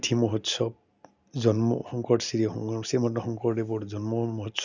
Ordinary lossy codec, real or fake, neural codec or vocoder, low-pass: none; real; none; 7.2 kHz